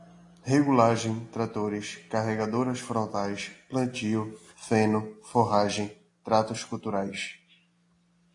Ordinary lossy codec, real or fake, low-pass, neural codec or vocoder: AAC, 48 kbps; real; 10.8 kHz; none